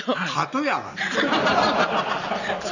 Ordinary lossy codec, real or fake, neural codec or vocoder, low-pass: none; real; none; 7.2 kHz